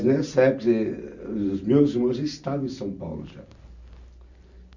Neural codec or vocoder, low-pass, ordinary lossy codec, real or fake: none; 7.2 kHz; none; real